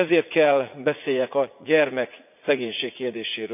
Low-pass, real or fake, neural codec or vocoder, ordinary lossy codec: 3.6 kHz; fake; vocoder, 44.1 kHz, 80 mel bands, Vocos; none